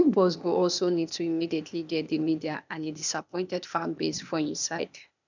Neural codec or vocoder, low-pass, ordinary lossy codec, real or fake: codec, 16 kHz, 0.8 kbps, ZipCodec; 7.2 kHz; none; fake